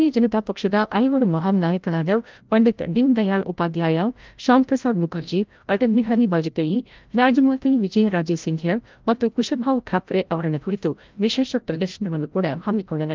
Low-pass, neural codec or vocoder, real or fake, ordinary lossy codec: 7.2 kHz; codec, 16 kHz, 0.5 kbps, FreqCodec, larger model; fake; Opus, 24 kbps